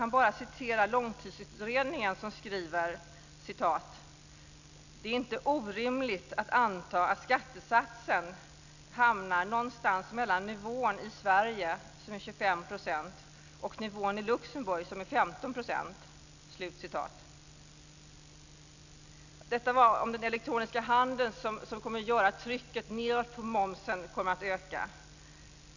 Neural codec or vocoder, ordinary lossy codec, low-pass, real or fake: none; none; 7.2 kHz; real